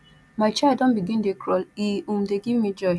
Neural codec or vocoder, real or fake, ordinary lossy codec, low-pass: none; real; none; none